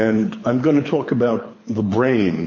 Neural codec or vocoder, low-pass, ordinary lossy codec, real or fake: codec, 24 kHz, 6 kbps, HILCodec; 7.2 kHz; MP3, 32 kbps; fake